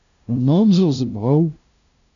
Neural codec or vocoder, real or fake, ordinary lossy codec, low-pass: codec, 16 kHz, 0.5 kbps, FunCodec, trained on LibriTTS, 25 frames a second; fake; MP3, 96 kbps; 7.2 kHz